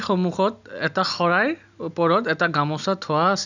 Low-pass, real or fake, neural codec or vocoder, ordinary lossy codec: 7.2 kHz; real; none; none